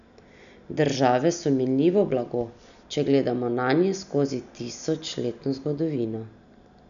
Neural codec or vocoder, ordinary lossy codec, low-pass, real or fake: none; none; 7.2 kHz; real